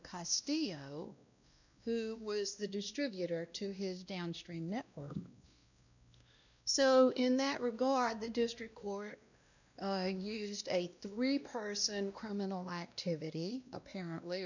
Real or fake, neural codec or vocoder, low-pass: fake; codec, 16 kHz, 1 kbps, X-Codec, WavLM features, trained on Multilingual LibriSpeech; 7.2 kHz